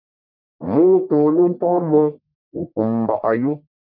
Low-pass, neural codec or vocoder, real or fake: 5.4 kHz; codec, 44.1 kHz, 1.7 kbps, Pupu-Codec; fake